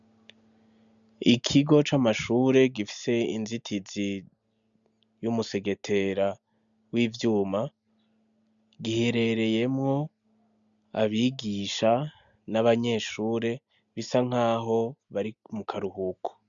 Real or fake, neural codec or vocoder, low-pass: real; none; 7.2 kHz